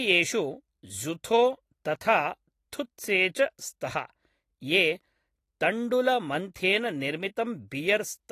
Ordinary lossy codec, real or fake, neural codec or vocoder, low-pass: AAC, 48 kbps; real; none; 14.4 kHz